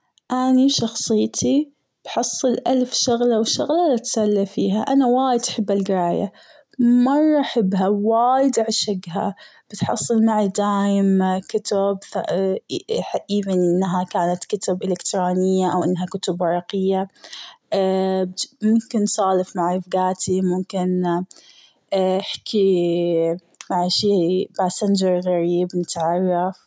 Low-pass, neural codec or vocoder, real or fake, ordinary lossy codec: none; none; real; none